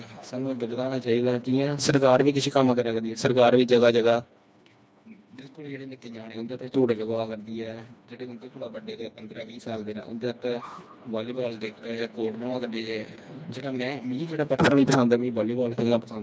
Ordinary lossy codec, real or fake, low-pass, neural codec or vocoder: none; fake; none; codec, 16 kHz, 2 kbps, FreqCodec, smaller model